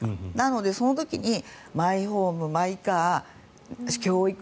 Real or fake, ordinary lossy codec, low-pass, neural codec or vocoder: real; none; none; none